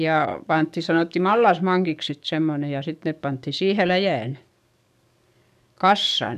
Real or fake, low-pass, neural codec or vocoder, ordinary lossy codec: fake; 14.4 kHz; codec, 44.1 kHz, 7.8 kbps, DAC; none